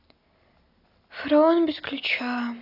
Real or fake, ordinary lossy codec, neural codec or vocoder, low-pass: real; AAC, 48 kbps; none; 5.4 kHz